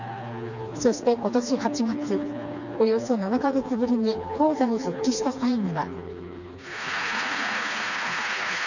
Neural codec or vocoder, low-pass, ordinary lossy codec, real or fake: codec, 16 kHz, 2 kbps, FreqCodec, smaller model; 7.2 kHz; none; fake